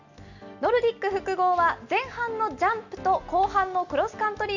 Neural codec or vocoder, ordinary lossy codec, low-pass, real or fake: none; none; 7.2 kHz; real